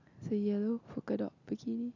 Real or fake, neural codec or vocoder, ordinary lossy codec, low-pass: real; none; none; 7.2 kHz